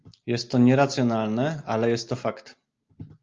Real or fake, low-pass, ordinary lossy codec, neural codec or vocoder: real; 7.2 kHz; Opus, 32 kbps; none